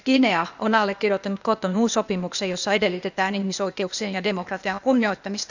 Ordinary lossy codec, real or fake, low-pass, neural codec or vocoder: none; fake; 7.2 kHz; codec, 16 kHz, 0.8 kbps, ZipCodec